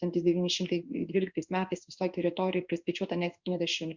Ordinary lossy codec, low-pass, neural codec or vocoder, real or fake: Opus, 64 kbps; 7.2 kHz; codec, 16 kHz in and 24 kHz out, 1 kbps, XY-Tokenizer; fake